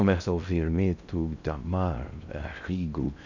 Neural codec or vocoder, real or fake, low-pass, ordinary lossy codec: codec, 16 kHz in and 24 kHz out, 0.6 kbps, FocalCodec, streaming, 2048 codes; fake; 7.2 kHz; none